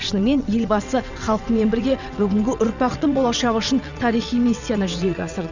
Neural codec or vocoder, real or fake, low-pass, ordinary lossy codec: vocoder, 22.05 kHz, 80 mel bands, WaveNeXt; fake; 7.2 kHz; none